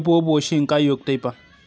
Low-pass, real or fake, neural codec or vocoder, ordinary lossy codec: none; real; none; none